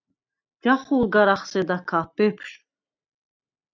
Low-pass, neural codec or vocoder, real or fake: 7.2 kHz; none; real